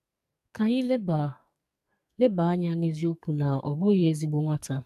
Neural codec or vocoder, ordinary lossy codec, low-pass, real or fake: codec, 44.1 kHz, 2.6 kbps, SNAC; none; 14.4 kHz; fake